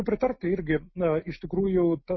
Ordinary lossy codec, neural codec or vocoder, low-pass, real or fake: MP3, 24 kbps; none; 7.2 kHz; real